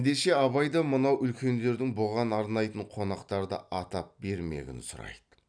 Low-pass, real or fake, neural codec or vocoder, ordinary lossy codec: 9.9 kHz; real; none; none